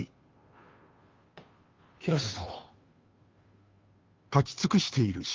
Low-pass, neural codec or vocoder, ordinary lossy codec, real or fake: 7.2 kHz; codec, 16 kHz, 2 kbps, FunCodec, trained on Chinese and English, 25 frames a second; Opus, 32 kbps; fake